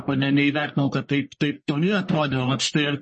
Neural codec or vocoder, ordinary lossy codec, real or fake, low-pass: codec, 44.1 kHz, 1.7 kbps, Pupu-Codec; MP3, 32 kbps; fake; 10.8 kHz